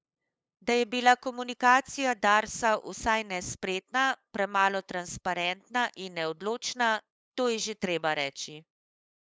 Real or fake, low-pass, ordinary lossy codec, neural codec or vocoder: fake; none; none; codec, 16 kHz, 8 kbps, FunCodec, trained on LibriTTS, 25 frames a second